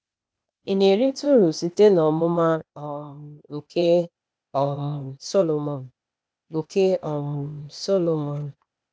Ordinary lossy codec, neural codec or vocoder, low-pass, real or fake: none; codec, 16 kHz, 0.8 kbps, ZipCodec; none; fake